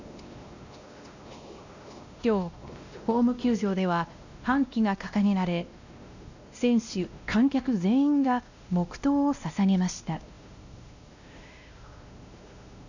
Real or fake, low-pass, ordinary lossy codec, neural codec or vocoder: fake; 7.2 kHz; none; codec, 16 kHz, 1 kbps, X-Codec, WavLM features, trained on Multilingual LibriSpeech